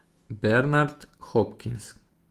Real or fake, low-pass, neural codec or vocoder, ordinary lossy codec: fake; 14.4 kHz; autoencoder, 48 kHz, 128 numbers a frame, DAC-VAE, trained on Japanese speech; Opus, 24 kbps